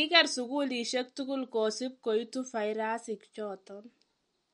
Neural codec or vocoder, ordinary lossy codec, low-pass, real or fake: none; MP3, 48 kbps; 10.8 kHz; real